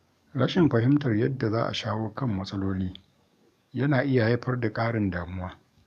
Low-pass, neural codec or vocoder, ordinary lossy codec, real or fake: 14.4 kHz; codec, 44.1 kHz, 7.8 kbps, DAC; none; fake